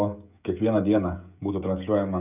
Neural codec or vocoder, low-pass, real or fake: codec, 16 kHz, 16 kbps, FreqCodec, smaller model; 3.6 kHz; fake